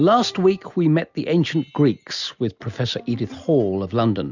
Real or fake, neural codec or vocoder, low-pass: real; none; 7.2 kHz